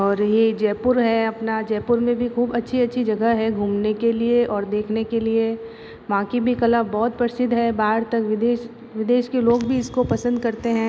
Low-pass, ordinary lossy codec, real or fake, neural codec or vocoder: none; none; real; none